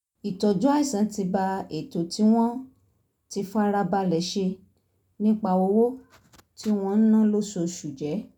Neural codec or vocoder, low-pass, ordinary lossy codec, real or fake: none; 19.8 kHz; none; real